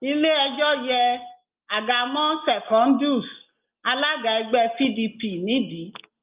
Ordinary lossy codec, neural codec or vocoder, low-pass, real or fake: Opus, 24 kbps; none; 3.6 kHz; real